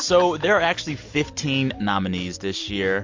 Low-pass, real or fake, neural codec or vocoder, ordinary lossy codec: 7.2 kHz; real; none; MP3, 48 kbps